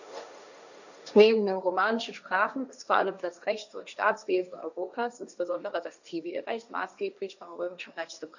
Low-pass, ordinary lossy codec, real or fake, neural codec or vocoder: 7.2 kHz; none; fake; codec, 16 kHz, 1.1 kbps, Voila-Tokenizer